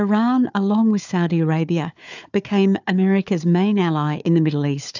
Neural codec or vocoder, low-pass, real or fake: codec, 16 kHz, 4 kbps, FunCodec, trained on Chinese and English, 50 frames a second; 7.2 kHz; fake